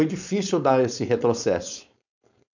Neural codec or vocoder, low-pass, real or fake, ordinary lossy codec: codec, 16 kHz, 4.8 kbps, FACodec; 7.2 kHz; fake; none